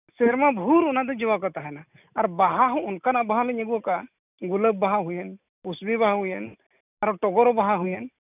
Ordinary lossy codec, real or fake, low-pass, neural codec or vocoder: none; real; 3.6 kHz; none